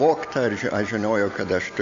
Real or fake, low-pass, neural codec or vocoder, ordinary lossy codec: real; 7.2 kHz; none; MP3, 48 kbps